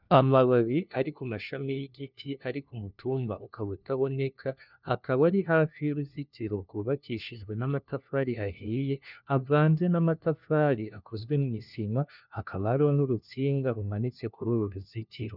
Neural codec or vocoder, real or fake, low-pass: codec, 16 kHz, 1 kbps, FunCodec, trained on LibriTTS, 50 frames a second; fake; 5.4 kHz